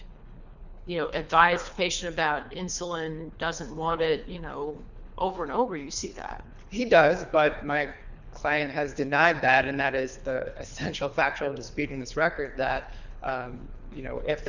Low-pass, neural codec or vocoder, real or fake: 7.2 kHz; codec, 24 kHz, 3 kbps, HILCodec; fake